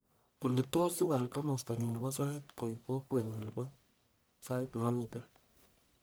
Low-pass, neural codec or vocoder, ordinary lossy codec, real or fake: none; codec, 44.1 kHz, 1.7 kbps, Pupu-Codec; none; fake